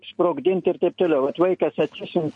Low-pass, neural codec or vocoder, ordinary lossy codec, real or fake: 10.8 kHz; none; MP3, 48 kbps; real